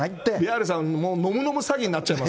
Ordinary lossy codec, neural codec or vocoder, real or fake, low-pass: none; none; real; none